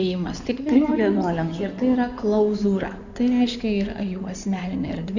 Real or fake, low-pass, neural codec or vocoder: fake; 7.2 kHz; codec, 16 kHz in and 24 kHz out, 2.2 kbps, FireRedTTS-2 codec